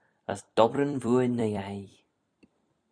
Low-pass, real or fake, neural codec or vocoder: 9.9 kHz; fake; vocoder, 44.1 kHz, 128 mel bands every 256 samples, BigVGAN v2